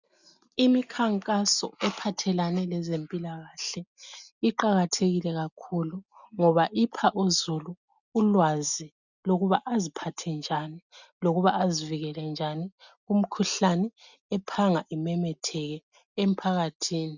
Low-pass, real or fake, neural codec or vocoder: 7.2 kHz; real; none